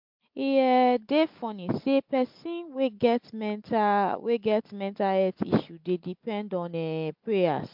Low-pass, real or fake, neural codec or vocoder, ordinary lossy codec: 5.4 kHz; real; none; MP3, 48 kbps